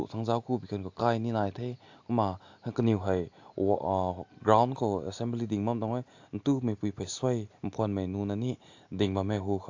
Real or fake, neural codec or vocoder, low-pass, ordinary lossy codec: real; none; 7.2 kHz; AAC, 48 kbps